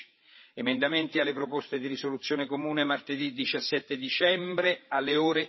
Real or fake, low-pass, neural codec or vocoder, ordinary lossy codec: fake; 7.2 kHz; codec, 16 kHz, 8 kbps, FreqCodec, larger model; MP3, 24 kbps